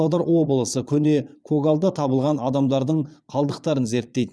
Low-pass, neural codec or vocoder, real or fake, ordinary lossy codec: none; vocoder, 22.05 kHz, 80 mel bands, Vocos; fake; none